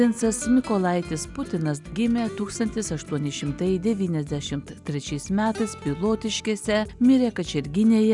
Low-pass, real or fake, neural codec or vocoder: 10.8 kHz; real; none